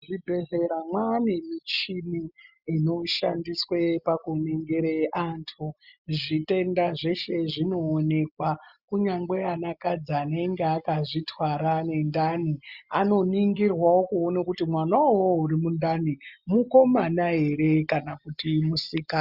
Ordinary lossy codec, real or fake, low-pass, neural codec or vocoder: Opus, 64 kbps; real; 5.4 kHz; none